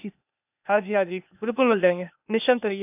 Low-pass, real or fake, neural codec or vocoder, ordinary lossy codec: 3.6 kHz; fake; codec, 16 kHz, 0.8 kbps, ZipCodec; none